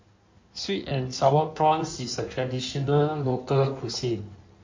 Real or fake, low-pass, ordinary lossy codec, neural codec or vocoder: fake; 7.2 kHz; MP3, 48 kbps; codec, 16 kHz in and 24 kHz out, 1.1 kbps, FireRedTTS-2 codec